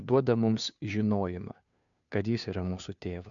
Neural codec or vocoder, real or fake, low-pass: codec, 16 kHz, 2 kbps, FunCodec, trained on Chinese and English, 25 frames a second; fake; 7.2 kHz